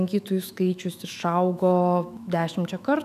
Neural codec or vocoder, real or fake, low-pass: autoencoder, 48 kHz, 128 numbers a frame, DAC-VAE, trained on Japanese speech; fake; 14.4 kHz